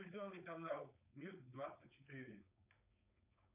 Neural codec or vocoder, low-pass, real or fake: codec, 16 kHz, 4.8 kbps, FACodec; 3.6 kHz; fake